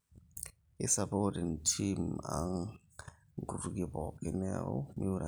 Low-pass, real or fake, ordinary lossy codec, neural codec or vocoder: none; real; none; none